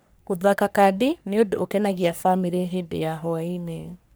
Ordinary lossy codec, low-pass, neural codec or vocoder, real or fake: none; none; codec, 44.1 kHz, 3.4 kbps, Pupu-Codec; fake